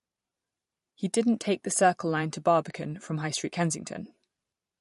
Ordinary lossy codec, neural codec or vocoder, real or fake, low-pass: MP3, 48 kbps; vocoder, 44.1 kHz, 128 mel bands every 512 samples, BigVGAN v2; fake; 14.4 kHz